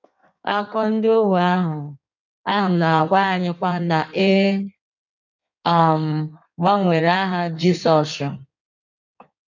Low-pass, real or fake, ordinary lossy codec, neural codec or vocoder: 7.2 kHz; fake; none; codec, 16 kHz in and 24 kHz out, 1.1 kbps, FireRedTTS-2 codec